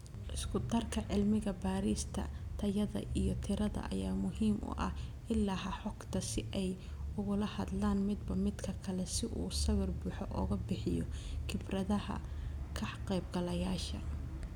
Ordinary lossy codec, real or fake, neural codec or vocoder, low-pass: none; real; none; 19.8 kHz